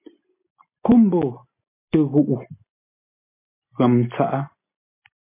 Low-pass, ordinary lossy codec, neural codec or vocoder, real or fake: 3.6 kHz; MP3, 24 kbps; none; real